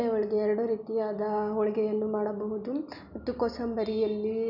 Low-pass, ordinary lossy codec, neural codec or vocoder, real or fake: 5.4 kHz; none; none; real